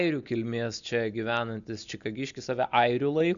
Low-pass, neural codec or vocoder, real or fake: 7.2 kHz; none; real